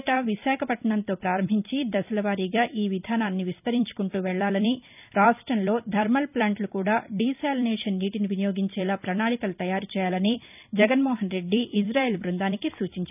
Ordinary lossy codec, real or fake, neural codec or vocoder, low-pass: none; fake; vocoder, 44.1 kHz, 128 mel bands every 256 samples, BigVGAN v2; 3.6 kHz